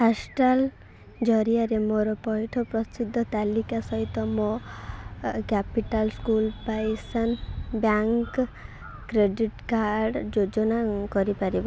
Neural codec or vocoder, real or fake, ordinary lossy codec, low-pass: none; real; none; none